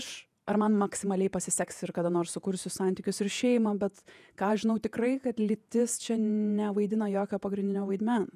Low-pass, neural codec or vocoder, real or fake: 14.4 kHz; vocoder, 48 kHz, 128 mel bands, Vocos; fake